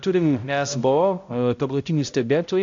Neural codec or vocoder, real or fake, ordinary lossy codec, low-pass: codec, 16 kHz, 0.5 kbps, X-Codec, HuBERT features, trained on balanced general audio; fake; AAC, 64 kbps; 7.2 kHz